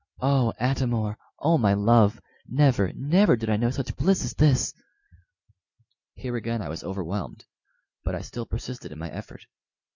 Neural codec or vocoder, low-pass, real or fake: none; 7.2 kHz; real